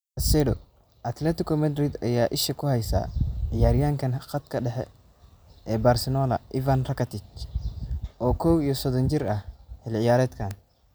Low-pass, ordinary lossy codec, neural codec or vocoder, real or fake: none; none; none; real